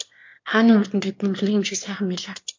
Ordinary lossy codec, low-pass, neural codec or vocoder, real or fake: MP3, 48 kbps; 7.2 kHz; autoencoder, 22.05 kHz, a latent of 192 numbers a frame, VITS, trained on one speaker; fake